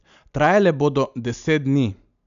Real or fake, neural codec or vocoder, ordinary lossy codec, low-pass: real; none; none; 7.2 kHz